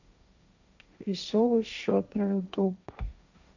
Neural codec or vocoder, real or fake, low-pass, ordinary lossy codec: codec, 16 kHz, 1.1 kbps, Voila-Tokenizer; fake; 7.2 kHz; none